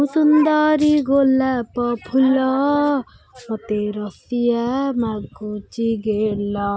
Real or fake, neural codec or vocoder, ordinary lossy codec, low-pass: real; none; none; none